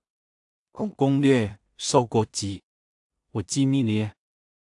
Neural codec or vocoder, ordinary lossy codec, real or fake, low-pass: codec, 16 kHz in and 24 kHz out, 0.4 kbps, LongCat-Audio-Codec, two codebook decoder; AAC, 64 kbps; fake; 10.8 kHz